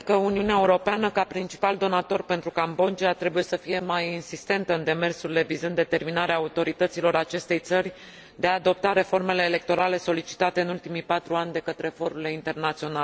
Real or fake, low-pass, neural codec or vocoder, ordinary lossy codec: real; none; none; none